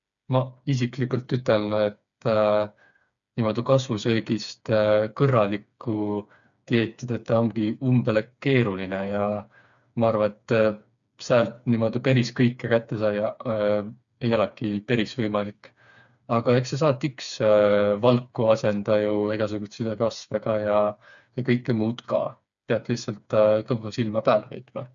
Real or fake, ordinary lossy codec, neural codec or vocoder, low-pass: fake; none; codec, 16 kHz, 4 kbps, FreqCodec, smaller model; 7.2 kHz